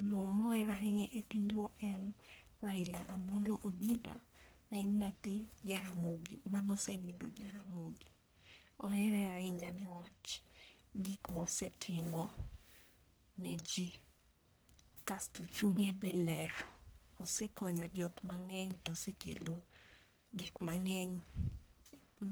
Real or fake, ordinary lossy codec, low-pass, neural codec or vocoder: fake; none; none; codec, 44.1 kHz, 1.7 kbps, Pupu-Codec